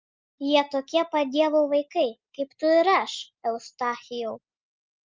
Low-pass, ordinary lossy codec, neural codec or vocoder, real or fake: 7.2 kHz; Opus, 24 kbps; none; real